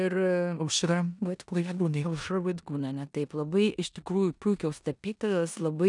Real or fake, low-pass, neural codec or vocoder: fake; 10.8 kHz; codec, 16 kHz in and 24 kHz out, 0.9 kbps, LongCat-Audio-Codec, four codebook decoder